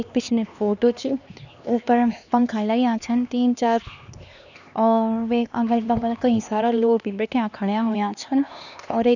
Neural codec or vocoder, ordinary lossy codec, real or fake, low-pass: codec, 16 kHz, 2 kbps, X-Codec, HuBERT features, trained on LibriSpeech; none; fake; 7.2 kHz